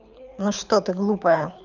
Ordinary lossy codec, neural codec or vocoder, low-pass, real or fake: none; codec, 24 kHz, 6 kbps, HILCodec; 7.2 kHz; fake